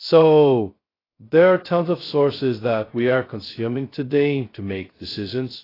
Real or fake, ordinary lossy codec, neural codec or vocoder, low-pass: fake; AAC, 24 kbps; codec, 16 kHz, 0.2 kbps, FocalCodec; 5.4 kHz